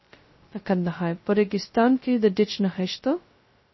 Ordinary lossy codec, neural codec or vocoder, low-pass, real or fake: MP3, 24 kbps; codec, 16 kHz, 0.2 kbps, FocalCodec; 7.2 kHz; fake